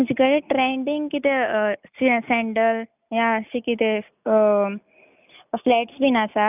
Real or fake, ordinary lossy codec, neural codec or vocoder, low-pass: real; none; none; 3.6 kHz